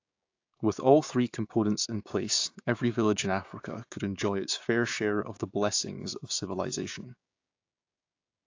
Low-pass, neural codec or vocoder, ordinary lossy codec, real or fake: 7.2 kHz; codec, 16 kHz, 6 kbps, DAC; AAC, 48 kbps; fake